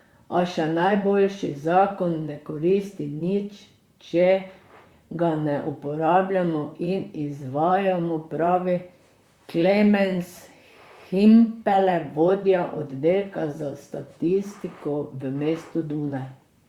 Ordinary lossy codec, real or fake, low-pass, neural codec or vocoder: Opus, 64 kbps; fake; 19.8 kHz; vocoder, 44.1 kHz, 128 mel bands, Pupu-Vocoder